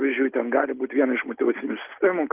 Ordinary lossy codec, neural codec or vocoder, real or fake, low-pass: Opus, 32 kbps; none; real; 3.6 kHz